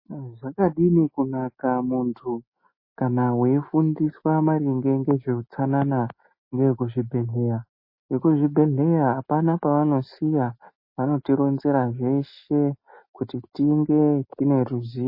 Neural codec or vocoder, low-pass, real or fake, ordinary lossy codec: none; 5.4 kHz; real; MP3, 24 kbps